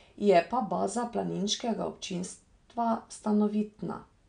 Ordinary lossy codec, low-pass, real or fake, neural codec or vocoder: none; 9.9 kHz; real; none